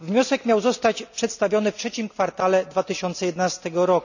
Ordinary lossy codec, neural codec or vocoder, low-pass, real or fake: none; none; 7.2 kHz; real